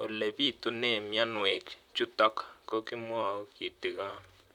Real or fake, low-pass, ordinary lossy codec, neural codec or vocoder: fake; 19.8 kHz; none; vocoder, 44.1 kHz, 128 mel bands, Pupu-Vocoder